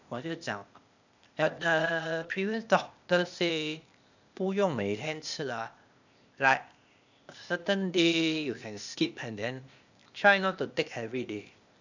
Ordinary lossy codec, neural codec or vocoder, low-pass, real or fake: none; codec, 16 kHz, 0.8 kbps, ZipCodec; 7.2 kHz; fake